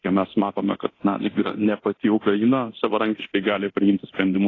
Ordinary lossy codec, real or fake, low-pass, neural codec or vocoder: AAC, 32 kbps; fake; 7.2 kHz; codec, 24 kHz, 0.9 kbps, DualCodec